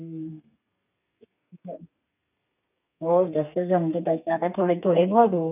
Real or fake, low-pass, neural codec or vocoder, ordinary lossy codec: fake; 3.6 kHz; codec, 32 kHz, 1.9 kbps, SNAC; none